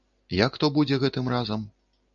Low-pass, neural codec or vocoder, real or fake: 7.2 kHz; none; real